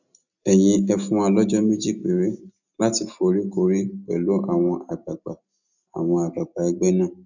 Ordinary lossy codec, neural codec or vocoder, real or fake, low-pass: none; none; real; 7.2 kHz